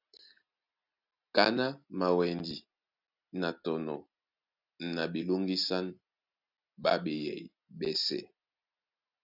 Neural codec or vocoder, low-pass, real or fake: none; 5.4 kHz; real